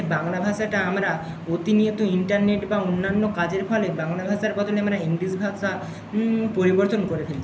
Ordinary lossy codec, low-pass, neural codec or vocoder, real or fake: none; none; none; real